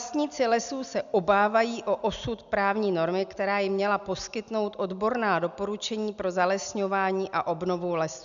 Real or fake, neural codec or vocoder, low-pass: real; none; 7.2 kHz